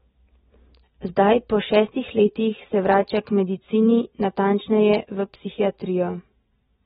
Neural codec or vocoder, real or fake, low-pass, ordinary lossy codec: none; real; 19.8 kHz; AAC, 16 kbps